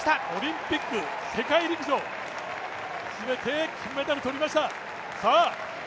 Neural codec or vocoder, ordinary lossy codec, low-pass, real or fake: none; none; none; real